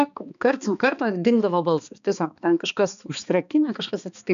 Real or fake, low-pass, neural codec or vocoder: fake; 7.2 kHz; codec, 16 kHz, 2 kbps, X-Codec, HuBERT features, trained on balanced general audio